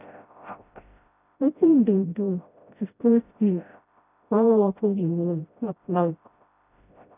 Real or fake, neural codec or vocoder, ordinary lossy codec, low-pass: fake; codec, 16 kHz, 0.5 kbps, FreqCodec, smaller model; none; 3.6 kHz